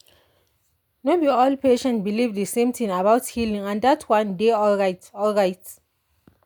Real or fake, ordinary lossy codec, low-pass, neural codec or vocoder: real; none; none; none